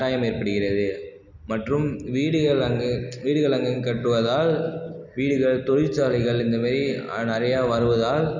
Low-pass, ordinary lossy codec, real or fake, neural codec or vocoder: 7.2 kHz; none; real; none